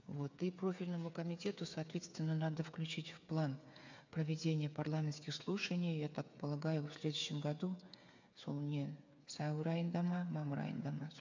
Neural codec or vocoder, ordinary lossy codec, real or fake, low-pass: codec, 16 kHz, 8 kbps, FreqCodec, smaller model; MP3, 64 kbps; fake; 7.2 kHz